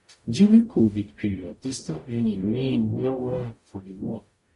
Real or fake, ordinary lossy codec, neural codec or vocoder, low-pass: fake; MP3, 48 kbps; codec, 44.1 kHz, 0.9 kbps, DAC; 14.4 kHz